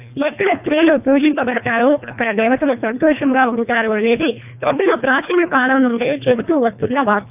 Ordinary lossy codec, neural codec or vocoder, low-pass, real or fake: AAC, 32 kbps; codec, 24 kHz, 1.5 kbps, HILCodec; 3.6 kHz; fake